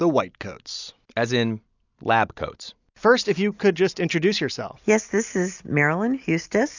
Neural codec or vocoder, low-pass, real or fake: none; 7.2 kHz; real